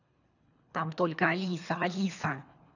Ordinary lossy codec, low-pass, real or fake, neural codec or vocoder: none; 7.2 kHz; fake; codec, 24 kHz, 3 kbps, HILCodec